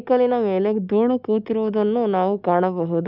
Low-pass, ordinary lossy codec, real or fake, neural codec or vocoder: 5.4 kHz; none; real; none